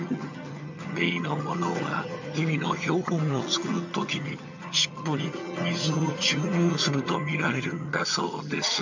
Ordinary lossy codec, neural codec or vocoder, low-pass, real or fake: MP3, 64 kbps; vocoder, 22.05 kHz, 80 mel bands, HiFi-GAN; 7.2 kHz; fake